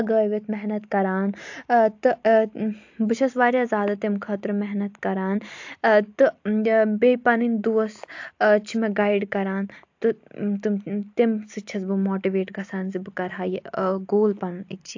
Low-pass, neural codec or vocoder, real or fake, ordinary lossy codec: 7.2 kHz; none; real; AAC, 48 kbps